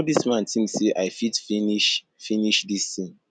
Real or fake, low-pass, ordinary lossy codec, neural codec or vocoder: real; 9.9 kHz; none; none